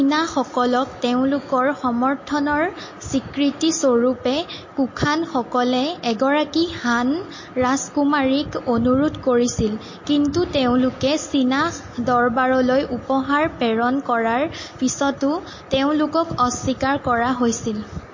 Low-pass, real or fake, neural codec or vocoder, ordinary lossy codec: 7.2 kHz; real; none; MP3, 32 kbps